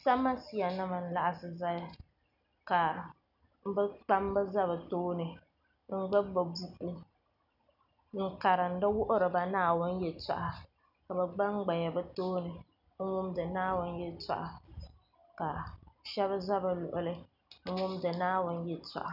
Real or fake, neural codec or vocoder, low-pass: real; none; 5.4 kHz